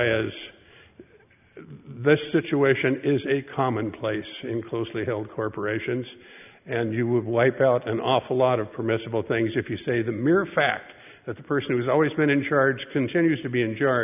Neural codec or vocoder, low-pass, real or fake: none; 3.6 kHz; real